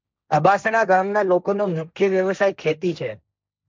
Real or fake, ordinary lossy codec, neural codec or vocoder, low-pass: fake; none; codec, 16 kHz, 1.1 kbps, Voila-Tokenizer; none